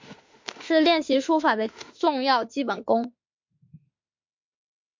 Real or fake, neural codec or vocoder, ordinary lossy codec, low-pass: fake; autoencoder, 48 kHz, 32 numbers a frame, DAC-VAE, trained on Japanese speech; MP3, 48 kbps; 7.2 kHz